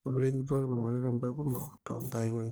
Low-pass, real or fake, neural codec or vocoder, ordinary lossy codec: none; fake; codec, 44.1 kHz, 1.7 kbps, Pupu-Codec; none